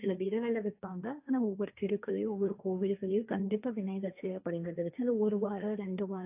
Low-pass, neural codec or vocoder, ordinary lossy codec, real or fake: 3.6 kHz; codec, 16 kHz, 1.1 kbps, Voila-Tokenizer; AAC, 32 kbps; fake